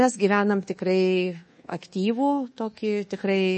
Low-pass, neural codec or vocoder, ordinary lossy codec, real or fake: 10.8 kHz; codec, 24 kHz, 1.2 kbps, DualCodec; MP3, 32 kbps; fake